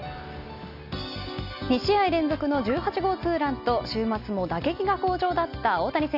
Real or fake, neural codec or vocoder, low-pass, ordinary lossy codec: real; none; 5.4 kHz; none